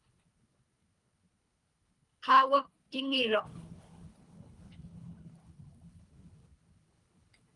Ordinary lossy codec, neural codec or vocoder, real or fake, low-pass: Opus, 32 kbps; codec, 24 kHz, 3 kbps, HILCodec; fake; 10.8 kHz